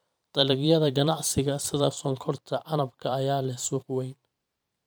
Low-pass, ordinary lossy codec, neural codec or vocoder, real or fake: none; none; vocoder, 44.1 kHz, 128 mel bands every 512 samples, BigVGAN v2; fake